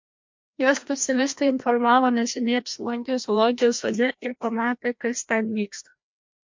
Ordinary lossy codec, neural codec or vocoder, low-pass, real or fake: MP3, 48 kbps; codec, 16 kHz, 1 kbps, FreqCodec, larger model; 7.2 kHz; fake